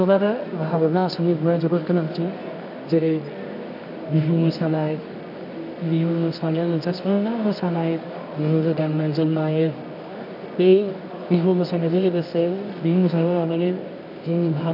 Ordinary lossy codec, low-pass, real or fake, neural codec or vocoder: none; 5.4 kHz; fake; codec, 24 kHz, 0.9 kbps, WavTokenizer, medium music audio release